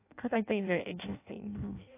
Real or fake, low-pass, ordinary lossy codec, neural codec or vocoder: fake; 3.6 kHz; AAC, 24 kbps; codec, 16 kHz in and 24 kHz out, 0.6 kbps, FireRedTTS-2 codec